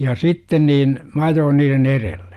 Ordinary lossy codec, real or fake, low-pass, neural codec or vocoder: Opus, 24 kbps; real; 14.4 kHz; none